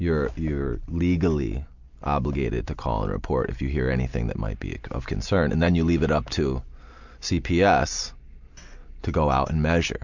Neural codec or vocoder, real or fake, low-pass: none; real; 7.2 kHz